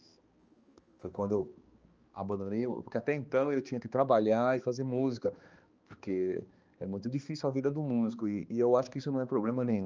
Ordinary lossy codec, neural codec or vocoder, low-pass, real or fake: Opus, 24 kbps; codec, 16 kHz, 2 kbps, X-Codec, HuBERT features, trained on balanced general audio; 7.2 kHz; fake